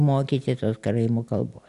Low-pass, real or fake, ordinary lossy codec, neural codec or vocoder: 10.8 kHz; real; AAC, 64 kbps; none